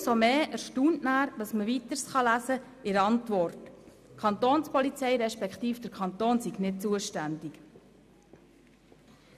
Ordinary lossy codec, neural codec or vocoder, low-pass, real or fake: none; none; 14.4 kHz; real